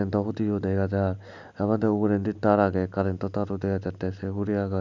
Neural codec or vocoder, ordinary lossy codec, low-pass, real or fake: none; none; 7.2 kHz; real